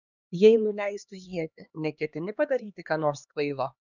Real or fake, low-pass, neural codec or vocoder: fake; 7.2 kHz; codec, 16 kHz, 2 kbps, X-Codec, HuBERT features, trained on LibriSpeech